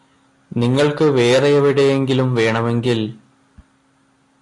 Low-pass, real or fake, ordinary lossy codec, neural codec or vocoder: 10.8 kHz; real; AAC, 48 kbps; none